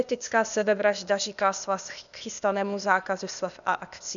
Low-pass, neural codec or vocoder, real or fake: 7.2 kHz; codec, 16 kHz, 0.8 kbps, ZipCodec; fake